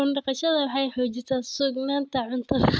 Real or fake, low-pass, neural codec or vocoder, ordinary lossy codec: real; none; none; none